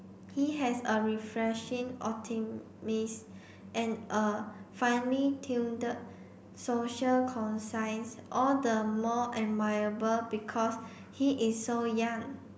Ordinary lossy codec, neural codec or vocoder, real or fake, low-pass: none; none; real; none